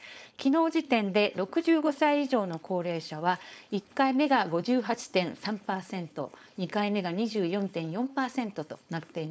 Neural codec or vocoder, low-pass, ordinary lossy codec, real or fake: codec, 16 kHz, 4.8 kbps, FACodec; none; none; fake